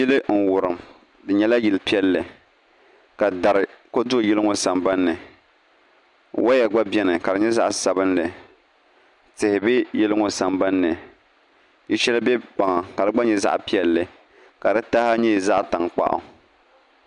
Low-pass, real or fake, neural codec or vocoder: 10.8 kHz; real; none